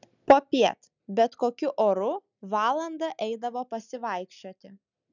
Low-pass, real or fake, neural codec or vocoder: 7.2 kHz; real; none